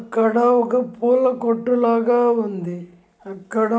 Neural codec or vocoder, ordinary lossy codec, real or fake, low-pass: none; none; real; none